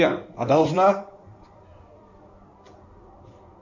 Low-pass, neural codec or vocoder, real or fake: 7.2 kHz; codec, 16 kHz in and 24 kHz out, 2.2 kbps, FireRedTTS-2 codec; fake